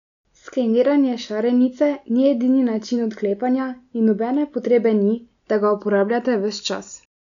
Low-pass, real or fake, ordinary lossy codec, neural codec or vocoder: 7.2 kHz; real; none; none